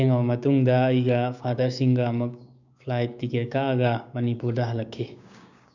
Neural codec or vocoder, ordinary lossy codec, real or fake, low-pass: codec, 16 kHz, 6 kbps, DAC; none; fake; 7.2 kHz